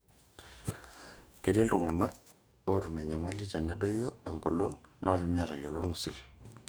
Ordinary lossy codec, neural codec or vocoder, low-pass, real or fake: none; codec, 44.1 kHz, 2.6 kbps, DAC; none; fake